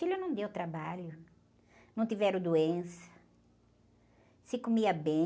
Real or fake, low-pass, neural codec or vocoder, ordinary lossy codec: real; none; none; none